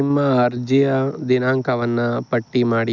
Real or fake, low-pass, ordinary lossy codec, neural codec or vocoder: real; 7.2 kHz; none; none